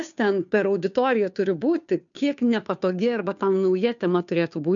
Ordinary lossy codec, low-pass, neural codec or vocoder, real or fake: MP3, 96 kbps; 7.2 kHz; codec, 16 kHz, 2 kbps, FunCodec, trained on Chinese and English, 25 frames a second; fake